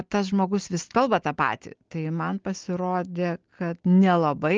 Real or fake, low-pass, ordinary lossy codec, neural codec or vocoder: real; 7.2 kHz; Opus, 24 kbps; none